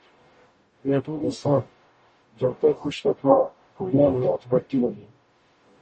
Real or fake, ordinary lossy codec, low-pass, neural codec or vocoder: fake; MP3, 32 kbps; 10.8 kHz; codec, 44.1 kHz, 0.9 kbps, DAC